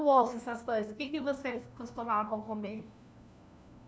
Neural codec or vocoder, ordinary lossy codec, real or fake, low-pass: codec, 16 kHz, 1 kbps, FunCodec, trained on LibriTTS, 50 frames a second; none; fake; none